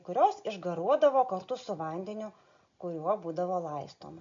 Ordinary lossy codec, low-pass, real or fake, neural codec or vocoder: AAC, 64 kbps; 7.2 kHz; real; none